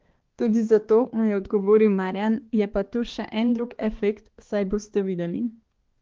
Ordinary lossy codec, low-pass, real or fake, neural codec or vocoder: Opus, 16 kbps; 7.2 kHz; fake; codec, 16 kHz, 2 kbps, X-Codec, HuBERT features, trained on balanced general audio